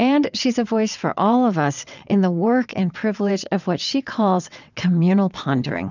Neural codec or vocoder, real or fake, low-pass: vocoder, 22.05 kHz, 80 mel bands, WaveNeXt; fake; 7.2 kHz